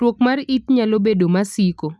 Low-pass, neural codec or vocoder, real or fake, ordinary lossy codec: none; none; real; none